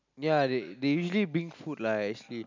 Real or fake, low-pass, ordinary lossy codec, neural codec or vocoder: real; 7.2 kHz; MP3, 64 kbps; none